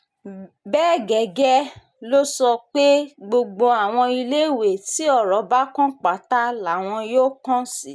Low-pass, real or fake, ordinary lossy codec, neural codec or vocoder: none; real; none; none